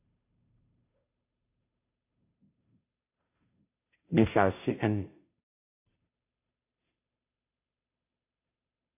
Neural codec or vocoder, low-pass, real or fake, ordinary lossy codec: codec, 16 kHz, 0.5 kbps, FunCodec, trained on Chinese and English, 25 frames a second; 3.6 kHz; fake; AAC, 32 kbps